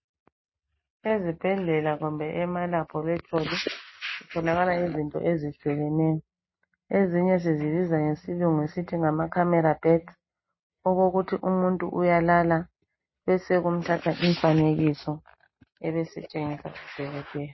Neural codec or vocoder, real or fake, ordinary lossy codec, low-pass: none; real; MP3, 24 kbps; 7.2 kHz